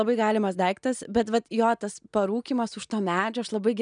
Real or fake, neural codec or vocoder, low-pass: real; none; 9.9 kHz